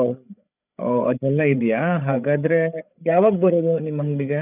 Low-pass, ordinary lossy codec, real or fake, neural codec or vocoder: 3.6 kHz; none; fake; codec, 16 kHz, 8 kbps, FreqCodec, larger model